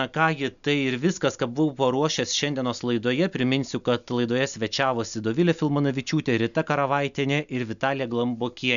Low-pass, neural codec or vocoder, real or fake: 7.2 kHz; none; real